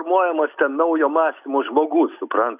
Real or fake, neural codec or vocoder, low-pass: real; none; 3.6 kHz